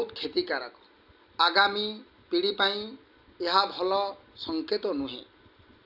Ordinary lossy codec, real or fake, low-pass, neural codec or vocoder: none; real; 5.4 kHz; none